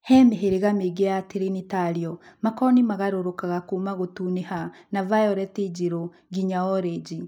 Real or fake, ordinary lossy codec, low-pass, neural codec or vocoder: real; none; 19.8 kHz; none